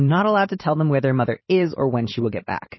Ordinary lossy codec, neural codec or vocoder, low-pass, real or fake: MP3, 24 kbps; vocoder, 44.1 kHz, 128 mel bands every 512 samples, BigVGAN v2; 7.2 kHz; fake